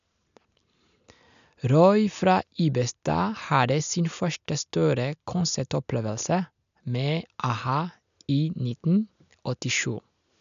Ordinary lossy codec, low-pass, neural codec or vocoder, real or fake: none; 7.2 kHz; none; real